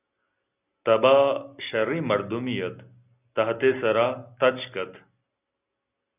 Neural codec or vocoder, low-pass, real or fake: none; 3.6 kHz; real